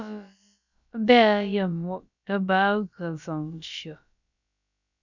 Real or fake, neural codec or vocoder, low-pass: fake; codec, 16 kHz, about 1 kbps, DyCAST, with the encoder's durations; 7.2 kHz